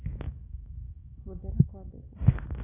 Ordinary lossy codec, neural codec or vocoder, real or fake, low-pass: AAC, 16 kbps; autoencoder, 48 kHz, 128 numbers a frame, DAC-VAE, trained on Japanese speech; fake; 3.6 kHz